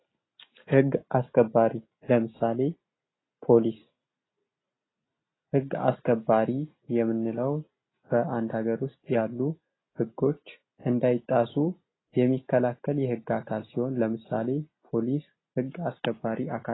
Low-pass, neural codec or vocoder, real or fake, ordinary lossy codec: 7.2 kHz; none; real; AAC, 16 kbps